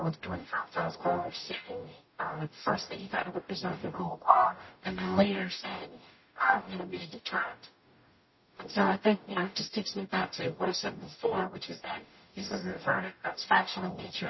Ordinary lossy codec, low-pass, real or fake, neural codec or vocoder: MP3, 24 kbps; 7.2 kHz; fake; codec, 44.1 kHz, 0.9 kbps, DAC